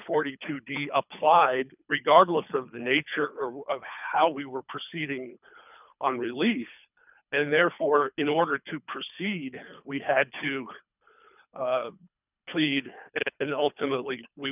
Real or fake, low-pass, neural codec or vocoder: fake; 3.6 kHz; codec, 24 kHz, 3 kbps, HILCodec